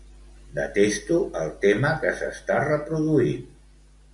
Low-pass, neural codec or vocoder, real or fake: 10.8 kHz; none; real